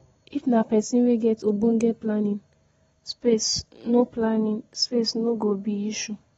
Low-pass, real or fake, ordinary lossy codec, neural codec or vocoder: 10.8 kHz; real; AAC, 24 kbps; none